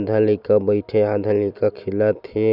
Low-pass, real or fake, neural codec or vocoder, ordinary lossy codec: 5.4 kHz; real; none; none